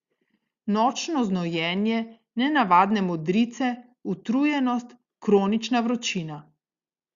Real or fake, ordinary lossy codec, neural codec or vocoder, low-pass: real; Opus, 64 kbps; none; 7.2 kHz